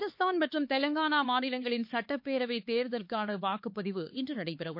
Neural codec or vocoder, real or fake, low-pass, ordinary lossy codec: codec, 16 kHz, 4 kbps, X-Codec, HuBERT features, trained on LibriSpeech; fake; 5.4 kHz; MP3, 32 kbps